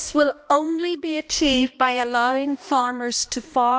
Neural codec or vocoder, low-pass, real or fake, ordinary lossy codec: codec, 16 kHz, 1 kbps, X-Codec, HuBERT features, trained on balanced general audio; none; fake; none